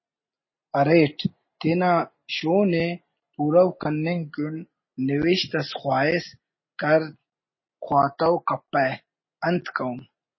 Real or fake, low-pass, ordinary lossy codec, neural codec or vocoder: real; 7.2 kHz; MP3, 24 kbps; none